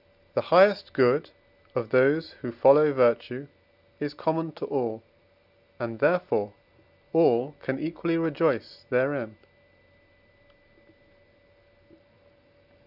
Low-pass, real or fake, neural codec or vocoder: 5.4 kHz; real; none